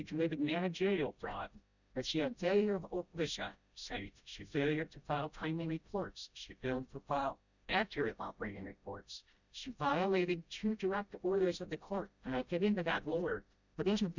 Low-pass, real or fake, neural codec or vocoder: 7.2 kHz; fake; codec, 16 kHz, 0.5 kbps, FreqCodec, smaller model